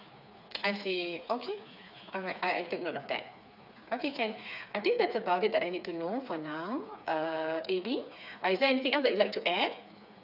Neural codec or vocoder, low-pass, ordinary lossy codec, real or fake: codec, 16 kHz, 4 kbps, FreqCodec, smaller model; 5.4 kHz; none; fake